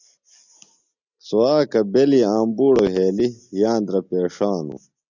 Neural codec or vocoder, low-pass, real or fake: none; 7.2 kHz; real